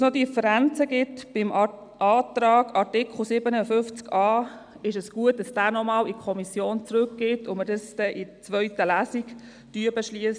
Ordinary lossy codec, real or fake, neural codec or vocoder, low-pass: none; real; none; 9.9 kHz